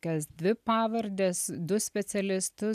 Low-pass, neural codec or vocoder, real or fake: 14.4 kHz; none; real